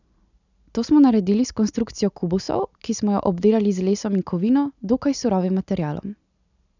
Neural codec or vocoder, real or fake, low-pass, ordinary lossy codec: none; real; 7.2 kHz; none